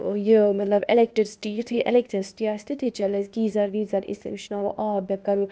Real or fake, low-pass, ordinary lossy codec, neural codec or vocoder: fake; none; none; codec, 16 kHz, 0.8 kbps, ZipCodec